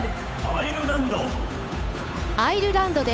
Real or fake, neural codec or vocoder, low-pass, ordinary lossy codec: fake; codec, 16 kHz, 8 kbps, FunCodec, trained on Chinese and English, 25 frames a second; none; none